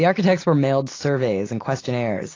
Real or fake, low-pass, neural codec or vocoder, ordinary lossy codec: real; 7.2 kHz; none; AAC, 32 kbps